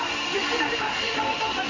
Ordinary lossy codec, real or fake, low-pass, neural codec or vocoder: AAC, 32 kbps; fake; 7.2 kHz; codec, 16 kHz in and 24 kHz out, 1 kbps, XY-Tokenizer